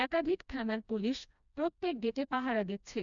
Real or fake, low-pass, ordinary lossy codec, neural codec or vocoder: fake; 7.2 kHz; none; codec, 16 kHz, 1 kbps, FreqCodec, smaller model